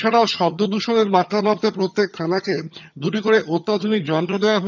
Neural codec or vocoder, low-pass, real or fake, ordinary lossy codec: vocoder, 22.05 kHz, 80 mel bands, HiFi-GAN; 7.2 kHz; fake; none